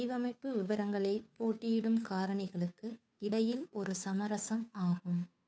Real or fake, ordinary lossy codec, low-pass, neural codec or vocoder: fake; none; none; codec, 16 kHz, 2 kbps, FunCodec, trained on Chinese and English, 25 frames a second